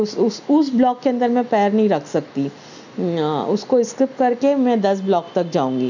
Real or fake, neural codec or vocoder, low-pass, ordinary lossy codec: real; none; 7.2 kHz; none